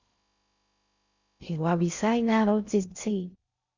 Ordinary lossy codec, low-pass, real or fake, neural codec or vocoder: Opus, 64 kbps; 7.2 kHz; fake; codec, 16 kHz in and 24 kHz out, 0.6 kbps, FocalCodec, streaming, 2048 codes